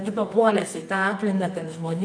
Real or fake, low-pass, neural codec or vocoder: fake; 9.9 kHz; codec, 24 kHz, 0.9 kbps, WavTokenizer, medium music audio release